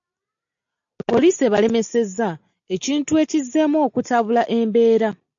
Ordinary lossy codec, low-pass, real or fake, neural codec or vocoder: AAC, 64 kbps; 7.2 kHz; real; none